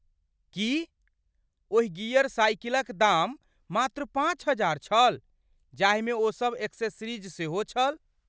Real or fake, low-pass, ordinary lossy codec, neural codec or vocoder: real; none; none; none